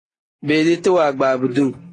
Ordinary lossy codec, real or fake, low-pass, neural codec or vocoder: AAC, 32 kbps; real; 10.8 kHz; none